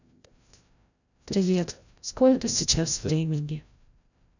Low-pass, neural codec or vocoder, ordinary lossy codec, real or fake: 7.2 kHz; codec, 16 kHz, 0.5 kbps, FreqCodec, larger model; none; fake